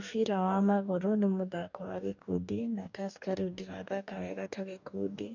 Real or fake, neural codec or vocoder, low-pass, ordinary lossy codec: fake; codec, 44.1 kHz, 2.6 kbps, DAC; 7.2 kHz; none